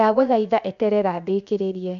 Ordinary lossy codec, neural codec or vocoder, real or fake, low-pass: none; codec, 16 kHz, 0.7 kbps, FocalCodec; fake; 7.2 kHz